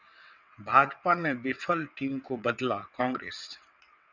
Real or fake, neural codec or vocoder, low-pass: fake; vocoder, 22.05 kHz, 80 mel bands, WaveNeXt; 7.2 kHz